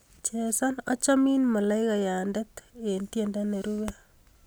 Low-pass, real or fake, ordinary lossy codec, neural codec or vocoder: none; real; none; none